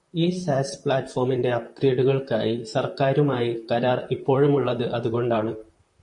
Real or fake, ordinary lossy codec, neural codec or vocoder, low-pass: fake; MP3, 48 kbps; vocoder, 44.1 kHz, 128 mel bands, Pupu-Vocoder; 10.8 kHz